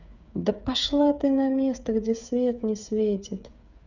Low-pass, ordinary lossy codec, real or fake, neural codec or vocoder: 7.2 kHz; none; fake; codec, 16 kHz, 8 kbps, FreqCodec, smaller model